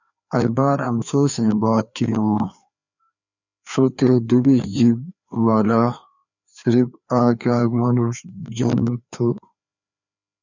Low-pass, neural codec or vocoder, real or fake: 7.2 kHz; codec, 16 kHz, 2 kbps, FreqCodec, larger model; fake